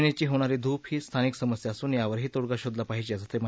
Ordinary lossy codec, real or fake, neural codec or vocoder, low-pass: none; real; none; none